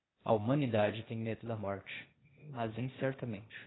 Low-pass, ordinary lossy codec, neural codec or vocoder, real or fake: 7.2 kHz; AAC, 16 kbps; codec, 16 kHz, 0.8 kbps, ZipCodec; fake